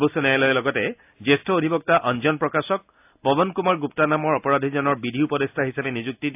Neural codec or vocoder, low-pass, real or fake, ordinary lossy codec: none; 3.6 kHz; real; AAC, 32 kbps